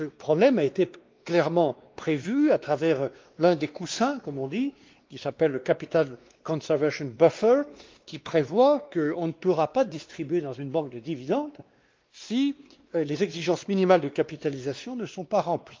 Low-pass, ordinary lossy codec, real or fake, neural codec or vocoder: 7.2 kHz; Opus, 32 kbps; fake; codec, 16 kHz, 2 kbps, X-Codec, WavLM features, trained on Multilingual LibriSpeech